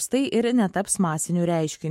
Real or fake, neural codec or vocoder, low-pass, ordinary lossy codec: fake; autoencoder, 48 kHz, 128 numbers a frame, DAC-VAE, trained on Japanese speech; 19.8 kHz; MP3, 64 kbps